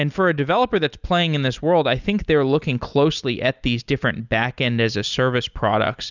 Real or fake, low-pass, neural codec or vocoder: real; 7.2 kHz; none